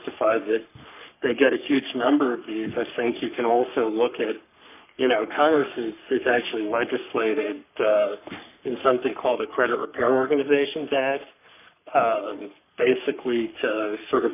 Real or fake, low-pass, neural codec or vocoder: fake; 3.6 kHz; codec, 44.1 kHz, 3.4 kbps, Pupu-Codec